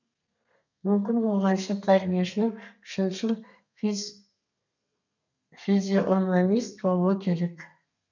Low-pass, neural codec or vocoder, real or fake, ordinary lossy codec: 7.2 kHz; codec, 32 kHz, 1.9 kbps, SNAC; fake; none